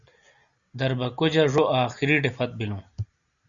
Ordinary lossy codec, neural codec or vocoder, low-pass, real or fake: Opus, 64 kbps; none; 7.2 kHz; real